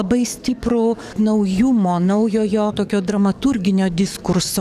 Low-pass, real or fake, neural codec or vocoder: 14.4 kHz; fake; codec, 44.1 kHz, 7.8 kbps, DAC